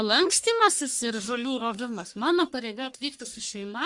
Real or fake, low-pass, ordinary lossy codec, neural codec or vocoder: fake; 10.8 kHz; Opus, 64 kbps; codec, 44.1 kHz, 1.7 kbps, Pupu-Codec